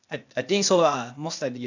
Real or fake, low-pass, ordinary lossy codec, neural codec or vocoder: fake; 7.2 kHz; none; codec, 16 kHz, 0.8 kbps, ZipCodec